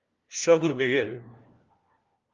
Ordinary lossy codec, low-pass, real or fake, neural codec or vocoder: Opus, 32 kbps; 7.2 kHz; fake; codec, 16 kHz, 1 kbps, FunCodec, trained on LibriTTS, 50 frames a second